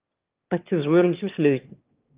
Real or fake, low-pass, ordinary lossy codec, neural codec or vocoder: fake; 3.6 kHz; Opus, 24 kbps; autoencoder, 22.05 kHz, a latent of 192 numbers a frame, VITS, trained on one speaker